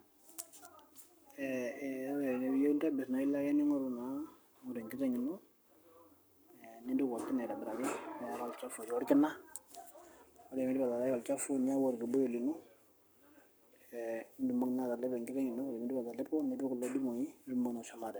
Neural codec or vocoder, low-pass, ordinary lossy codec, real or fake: codec, 44.1 kHz, 7.8 kbps, Pupu-Codec; none; none; fake